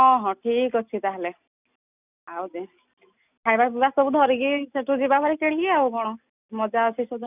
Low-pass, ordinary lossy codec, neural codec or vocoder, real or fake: 3.6 kHz; none; none; real